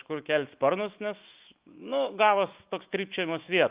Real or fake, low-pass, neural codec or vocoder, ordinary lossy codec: fake; 3.6 kHz; autoencoder, 48 kHz, 128 numbers a frame, DAC-VAE, trained on Japanese speech; Opus, 32 kbps